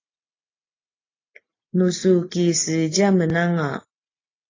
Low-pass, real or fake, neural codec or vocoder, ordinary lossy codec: 7.2 kHz; real; none; AAC, 32 kbps